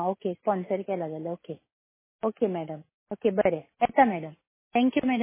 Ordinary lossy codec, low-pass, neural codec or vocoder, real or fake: MP3, 16 kbps; 3.6 kHz; none; real